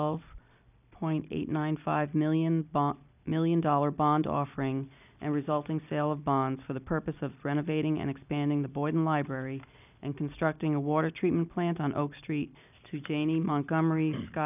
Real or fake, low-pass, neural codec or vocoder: real; 3.6 kHz; none